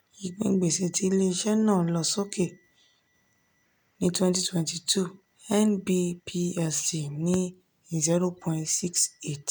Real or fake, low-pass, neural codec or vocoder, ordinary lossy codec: real; none; none; none